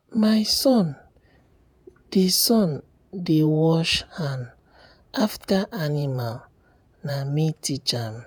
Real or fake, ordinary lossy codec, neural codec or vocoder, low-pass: fake; none; vocoder, 48 kHz, 128 mel bands, Vocos; none